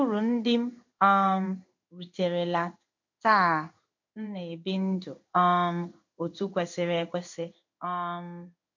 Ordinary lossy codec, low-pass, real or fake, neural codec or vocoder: MP3, 48 kbps; 7.2 kHz; fake; codec, 16 kHz in and 24 kHz out, 1 kbps, XY-Tokenizer